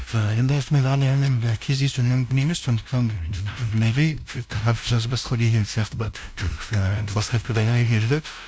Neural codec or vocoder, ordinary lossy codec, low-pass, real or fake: codec, 16 kHz, 0.5 kbps, FunCodec, trained on LibriTTS, 25 frames a second; none; none; fake